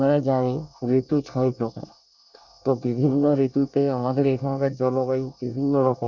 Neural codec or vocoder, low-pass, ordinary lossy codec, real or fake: codec, 24 kHz, 1 kbps, SNAC; 7.2 kHz; none; fake